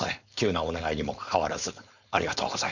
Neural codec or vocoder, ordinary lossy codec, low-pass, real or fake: codec, 16 kHz, 4.8 kbps, FACodec; none; 7.2 kHz; fake